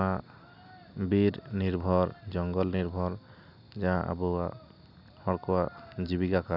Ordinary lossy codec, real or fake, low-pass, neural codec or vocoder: none; real; 5.4 kHz; none